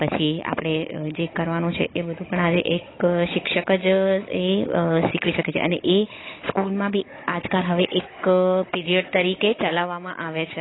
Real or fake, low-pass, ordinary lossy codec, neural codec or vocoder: fake; 7.2 kHz; AAC, 16 kbps; codec, 16 kHz, 16 kbps, FunCodec, trained on Chinese and English, 50 frames a second